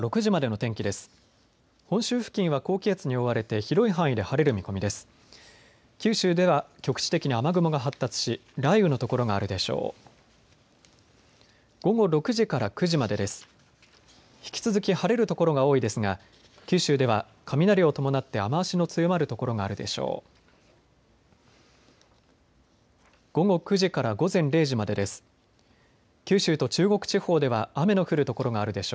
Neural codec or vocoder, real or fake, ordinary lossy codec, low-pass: none; real; none; none